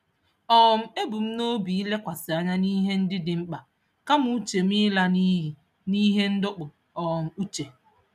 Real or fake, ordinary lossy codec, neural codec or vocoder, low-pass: real; none; none; 14.4 kHz